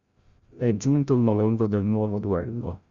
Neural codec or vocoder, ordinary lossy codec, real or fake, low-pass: codec, 16 kHz, 0.5 kbps, FreqCodec, larger model; Opus, 64 kbps; fake; 7.2 kHz